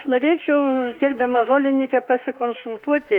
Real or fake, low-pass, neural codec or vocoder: fake; 19.8 kHz; autoencoder, 48 kHz, 32 numbers a frame, DAC-VAE, trained on Japanese speech